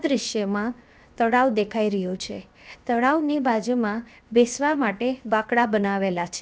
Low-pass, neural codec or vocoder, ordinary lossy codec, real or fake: none; codec, 16 kHz, 0.7 kbps, FocalCodec; none; fake